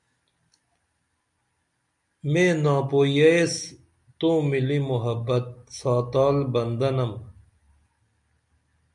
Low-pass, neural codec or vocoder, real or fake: 10.8 kHz; none; real